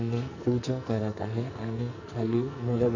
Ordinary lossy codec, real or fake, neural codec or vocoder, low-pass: none; fake; codec, 44.1 kHz, 2.6 kbps, SNAC; 7.2 kHz